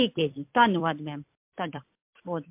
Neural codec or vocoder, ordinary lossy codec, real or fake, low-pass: vocoder, 44.1 kHz, 128 mel bands every 256 samples, BigVGAN v2; MP3, 32 kbps; fake; 3.6 kHz